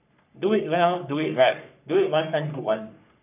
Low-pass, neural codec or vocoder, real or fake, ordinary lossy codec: 3.6 kHz; codec, 44.1 kHz, 3.4 kbps, Pupu-Codec; fake; none